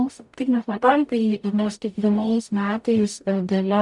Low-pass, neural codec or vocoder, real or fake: 10.8 kHz; codec, 44.1 kHz, 0.9 kbps, DAC; fake